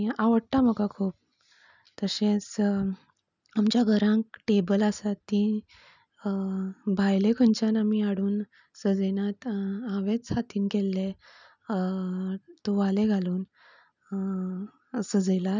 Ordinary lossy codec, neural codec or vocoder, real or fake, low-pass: none; none; real; 7.2 kHz